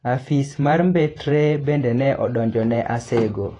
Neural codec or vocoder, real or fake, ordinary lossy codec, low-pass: vocoder, 48 kHz, 128 mel bands, Vocos; fake; AAC, 32 kbps; 10.8 kHz